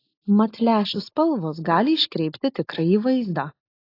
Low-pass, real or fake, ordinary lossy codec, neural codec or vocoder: 5.4 kHz; real; AAC, 32 kbps; none